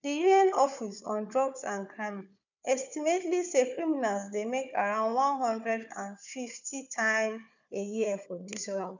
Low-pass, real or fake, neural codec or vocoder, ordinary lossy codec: 7.2 kHz; fake; codec, 16 kHz, 4 kbps, FunCodec, trained on Chinese and English, 50 frames a second; none